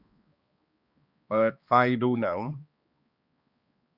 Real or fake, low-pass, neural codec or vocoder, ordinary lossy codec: fake; 5.4 kHz; codec, 16 kHz, 2 kbps, X-Codec, HuBERT features, trained on balanced general audio; Opus, 64 kbps